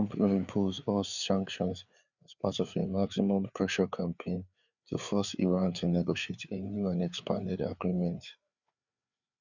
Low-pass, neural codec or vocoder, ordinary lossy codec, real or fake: 7.2 kHz; codec, 16 kHz, 4 kbps, FreqCodec, larger model; none; fake